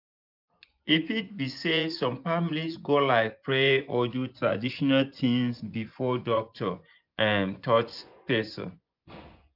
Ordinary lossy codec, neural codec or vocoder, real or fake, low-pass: none; vocoder, 24 kHz, 100 mel bands, Vocos; fake; 5.4 kHz